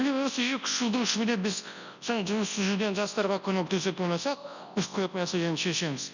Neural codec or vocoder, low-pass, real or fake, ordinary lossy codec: codec, 24 kHz, 0.9 kbps, WavTokenizer, large speech release; 7.2 kHz; fake; none